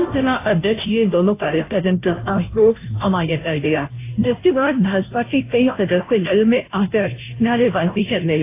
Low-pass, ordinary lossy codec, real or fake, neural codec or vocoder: 3.6 kHz; AAC, 24 kbps; fake; codec, 16 kHz, 0.5 kbps, FunCodec, trained on Chinese and English, 25 frames a second